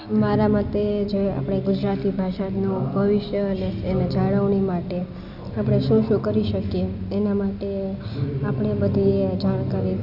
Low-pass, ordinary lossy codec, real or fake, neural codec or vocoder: 5.4 kHz; none; real; none